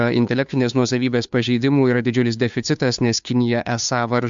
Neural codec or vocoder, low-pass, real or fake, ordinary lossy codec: codec, 16 kHz, 2 kbps, FunCodec, trained on Chinese and English, 25 frames a second; 7.2 kHz; fake; MP3, 64 kbps